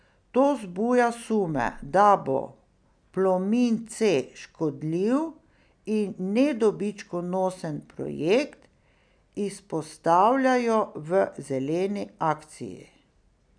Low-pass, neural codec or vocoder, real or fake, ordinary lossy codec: 9.9 kHz; none; real; none